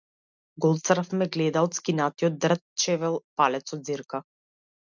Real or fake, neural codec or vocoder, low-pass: real; none; 7.2 kHz